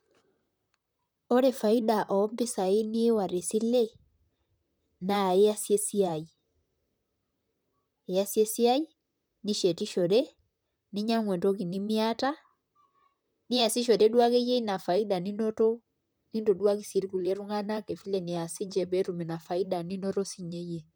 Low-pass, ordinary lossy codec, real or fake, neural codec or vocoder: none; none; fake; vocoder, 44.1 kHz, 128 mel bands, Pupu-Vocoder